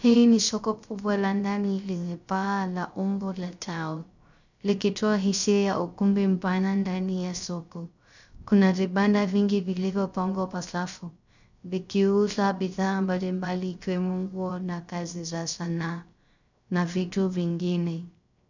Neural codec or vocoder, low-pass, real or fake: codec, 16 kHz, 0.3 kbps, FocalCodec; 7.2 kHz; fake